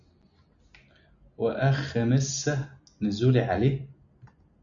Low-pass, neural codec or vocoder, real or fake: 7.2 kHz; none; real